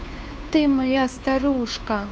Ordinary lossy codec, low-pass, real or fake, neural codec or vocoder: none; none; fake; codec, 16 kHz, 2 kbps, FunCodec, trained on Chinese and English, 25 frames a second